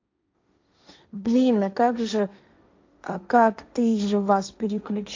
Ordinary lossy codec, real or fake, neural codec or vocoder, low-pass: MP3, 64 kbps; fake; codec, 16 kHz, 1.1 kbps, Voila-Tokenizer; 7.2 kHz